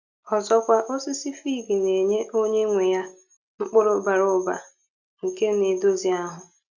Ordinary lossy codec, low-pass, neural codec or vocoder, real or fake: none; 7.2 kHz; none; real